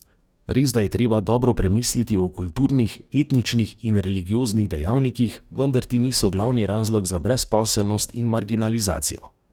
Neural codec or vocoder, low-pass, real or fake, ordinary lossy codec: codec, 44.1 kHz, 2.6 kbps, DAC; 19.8 kHz; fake; none